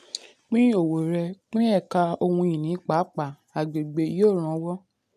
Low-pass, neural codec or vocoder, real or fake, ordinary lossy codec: 14.4 kHz; none; real; none